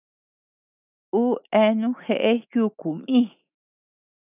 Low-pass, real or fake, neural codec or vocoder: 3.6 kHz; fake; autoencoder, 48 kHz, 128 numbers a frame, DAC-VAE, trained on Japanese speech